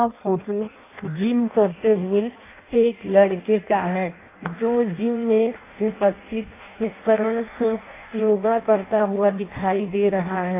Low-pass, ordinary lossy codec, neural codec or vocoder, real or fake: 3.6 kHz; AAC, 24 kbps; codec, 16 kHz in and 24 kHz out, 0.6 kbps, FireRedTTS-2 codec; fake